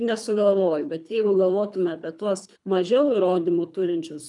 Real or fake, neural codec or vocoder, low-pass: fake; codec, 24 kHz, 3 kbps, HILCodec; 10.8 kHz